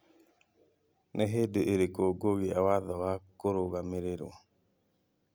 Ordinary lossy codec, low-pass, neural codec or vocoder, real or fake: none; none; none; real